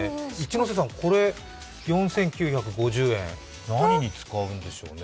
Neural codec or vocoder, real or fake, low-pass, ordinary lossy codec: none; real; none; none